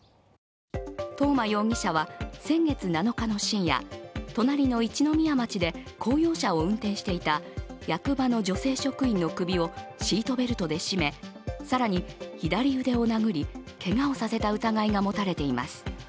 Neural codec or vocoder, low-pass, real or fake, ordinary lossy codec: none; none; real; none